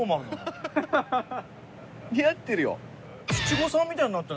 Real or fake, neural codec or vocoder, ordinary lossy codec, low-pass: real; none; none; none